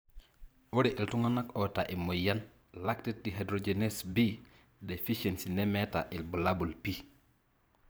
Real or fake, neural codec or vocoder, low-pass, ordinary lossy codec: fake; vocoder, 44.1 kHz, 128 mel bands every 512 samples, BigVGAN v2; none; none